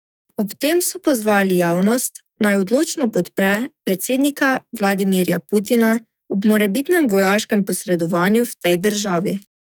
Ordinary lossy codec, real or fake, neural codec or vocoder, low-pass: none; fake; codec, 44.1 kHz, 2.6 kbps, SNAC; none